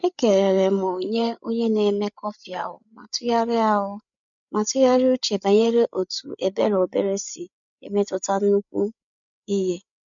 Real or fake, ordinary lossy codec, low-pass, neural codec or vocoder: fake; none; 7.2 kHz; codec, 16 kHz, 8 kbps, FreqCodec, larger model